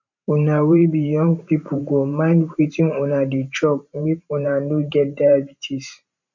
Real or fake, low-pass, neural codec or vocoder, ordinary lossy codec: fake; 7.2 kHz; vocoder, 44.1 kHz, 128 mel bands every 512 samples, BigVGAN v2; none